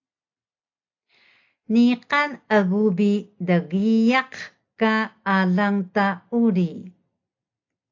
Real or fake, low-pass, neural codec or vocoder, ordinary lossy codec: real; 7.2 kHz; none; AAC, 48 kbps